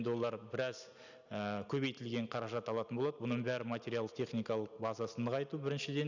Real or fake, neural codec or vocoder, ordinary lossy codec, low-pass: real; none; none; 7.2 kHz